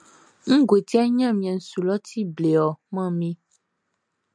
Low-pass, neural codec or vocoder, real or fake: 9.9 kHz; none; real